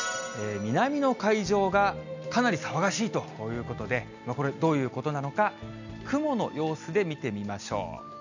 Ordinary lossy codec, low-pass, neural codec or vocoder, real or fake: none; 7.2 kHz; none; real